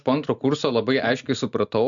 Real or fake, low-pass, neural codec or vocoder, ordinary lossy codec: real; 7.2 kHz; none; MP3, 64 kbps